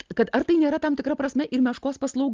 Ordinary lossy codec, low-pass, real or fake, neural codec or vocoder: Opus, 32 kbps; 7.2 kHz; real; none